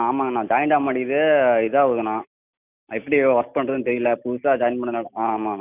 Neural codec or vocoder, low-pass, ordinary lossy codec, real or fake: none; 3.6 kHz; none; real